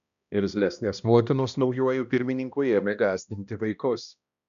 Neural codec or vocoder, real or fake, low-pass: codec, 16 kHz, 1 kbps, X-Codec, HuBERT features, trained on balanced general audio; fake; 7.2 kHz